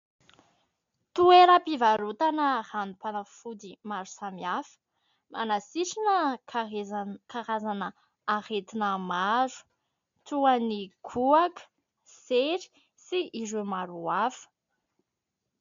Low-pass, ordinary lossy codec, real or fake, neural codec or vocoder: 7.2 kHz; MP3, 64 kbps; real; none